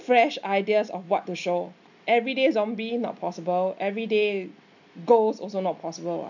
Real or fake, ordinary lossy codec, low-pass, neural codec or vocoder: real; none; 7.2 kHz; none